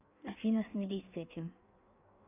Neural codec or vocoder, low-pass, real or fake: codec, 16 kHz in and 24 kHz out, 1.1 kbps, FireRedTTS-2 codec; 3.6 kHz; fake